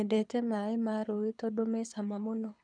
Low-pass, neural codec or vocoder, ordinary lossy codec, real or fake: 9.9 kHz; codec, 24 kHz, 6 kbps, HILCodec; none; fake